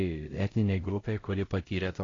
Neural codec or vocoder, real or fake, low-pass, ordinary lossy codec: codec, 16 kHz, 0.5 kbps, X-Codec, HuBERT features, trained on LibriSpeech; fake; 7.2 kHz; AAC, 32 kbps